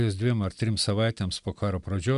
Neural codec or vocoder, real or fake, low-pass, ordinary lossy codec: none; real; 10.8 kHz; AAC, 96 kbps